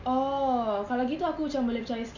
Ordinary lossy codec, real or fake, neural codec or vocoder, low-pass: none; real; none; 7.2 kHz